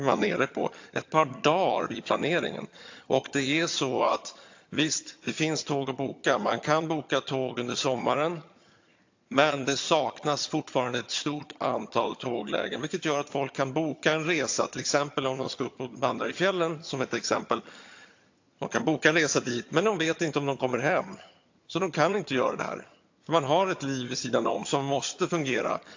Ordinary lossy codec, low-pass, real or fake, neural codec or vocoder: AAC, 48 kbps; 7.2 kHz; fake; vocoder, 22.05 kHz, 80 mel bands, HiFi-GAN